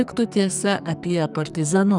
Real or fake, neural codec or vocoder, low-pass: fake; codec, 44.1 kHz, 2.6 kbps, SNAC; 10.8 kHz